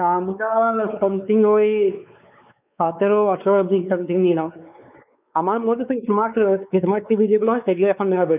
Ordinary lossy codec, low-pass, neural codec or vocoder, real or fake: none; 3.6 kHz; codec, 16 kHz, 4 kbps, X-Codec, WavLM features, trained on Multilingual LibriSpeech; fake